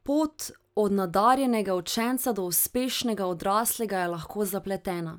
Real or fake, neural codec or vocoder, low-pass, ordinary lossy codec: real; none; none; none